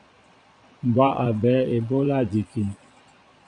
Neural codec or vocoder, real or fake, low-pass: vocoder, 22.05 kHz, 80 mel bands, Vocos; fake; 9.9 kHz